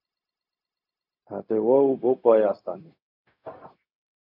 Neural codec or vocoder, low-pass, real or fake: codec, 16 kHz, 0.4 kbps, LongCat-Audio-Codec; 5.4 kHz; fake